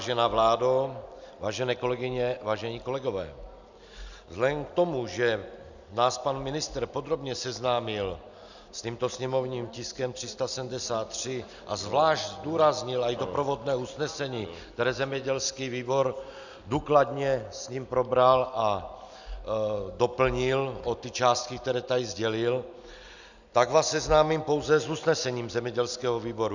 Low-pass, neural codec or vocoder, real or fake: 7.2 kHz; none; real